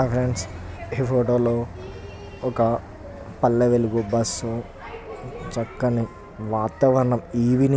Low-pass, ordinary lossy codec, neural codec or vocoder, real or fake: none; none; none; real